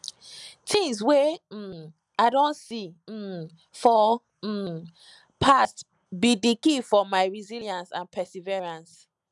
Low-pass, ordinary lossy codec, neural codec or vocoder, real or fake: 10.8 kHz; none; none; real